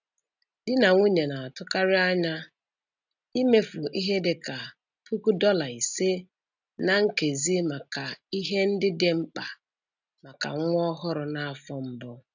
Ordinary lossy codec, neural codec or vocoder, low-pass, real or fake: none; none; 7.2 kHz; real